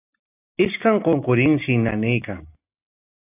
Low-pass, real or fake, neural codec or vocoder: 3.6 kHz; real; none